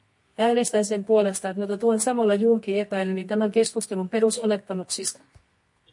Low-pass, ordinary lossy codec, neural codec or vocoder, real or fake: 10.8 kHz; MP3, 48 kbps; codec, 24 kHz, 0.9 kbps, WavTokenizer, medium music audio release; fake